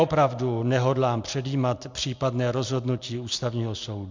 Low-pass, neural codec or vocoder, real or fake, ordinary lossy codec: 7.2 kHz; none; real; MP3, 64 kbps